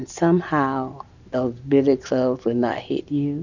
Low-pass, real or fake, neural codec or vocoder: 7.2 kHz; real; none